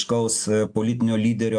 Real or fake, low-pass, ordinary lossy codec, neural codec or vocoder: real; 9.9 kHz; AAC, 64 kbps; none